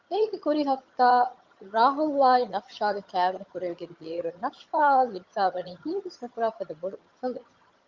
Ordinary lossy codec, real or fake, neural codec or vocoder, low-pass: Opus, 24 kbps; fake; vocoder, 22.05 kHz, 80 mel bands, HiFi-GAN; 7.2 kHz